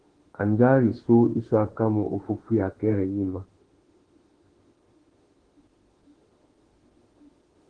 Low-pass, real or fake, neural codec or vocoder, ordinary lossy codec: 9.9 kHz; fake; autoencoder, 48 kHz, 32 numbers a frame, DAC-VAE, trained on Japanese speech; Opus, 16 kbps